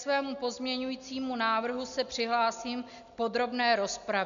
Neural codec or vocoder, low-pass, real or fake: none; 7.2 kHz; real